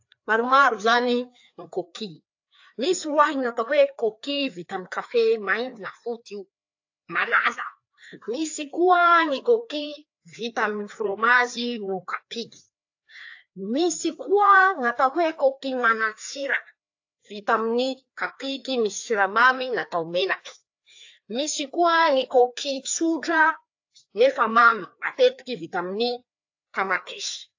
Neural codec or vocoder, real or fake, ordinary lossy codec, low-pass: codec, 16 kHz, 2 kbps, FreqCodec, larger model; fake; AAC, 48 kbps; 7.2 kHz